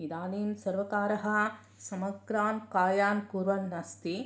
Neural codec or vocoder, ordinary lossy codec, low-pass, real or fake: none; none; none; real